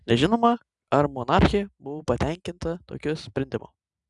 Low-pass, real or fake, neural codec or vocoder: 10.8 kHz; real; none